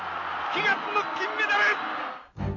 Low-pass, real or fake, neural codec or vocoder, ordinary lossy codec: 7.2 kHz; real; none; none